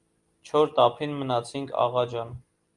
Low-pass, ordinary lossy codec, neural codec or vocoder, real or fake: 10.8 kHz; Opus, 32 kbps; none; real